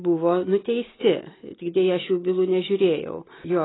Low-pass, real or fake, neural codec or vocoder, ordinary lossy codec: 7.2 kHz; real; none; AAC, 16 kbps